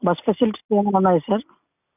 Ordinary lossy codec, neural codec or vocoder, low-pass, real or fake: none; none; 3.6 kHz; real